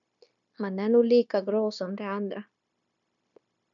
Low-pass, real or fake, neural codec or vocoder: 7.2 kHz; fake; codec, 16 kHz, 0.9 kbps, LongCat-Audio-Codec